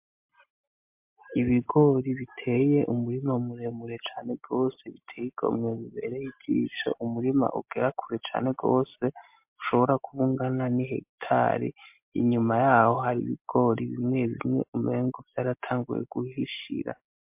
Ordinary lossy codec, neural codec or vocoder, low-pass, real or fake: MP3, 32 kbps; none; 3.6 kHz; real